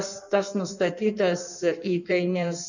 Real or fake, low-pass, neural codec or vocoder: fake; 7.2 kHz; codec, 16 kHz in and 24 kHz out, 1.1 kbps, FireRedTTS-2 codec